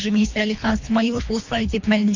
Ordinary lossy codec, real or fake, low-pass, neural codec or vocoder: AAC, 48 kbps; fake; 7.2 kHz; codec, 24 kHz, 3 kbps, HILCodec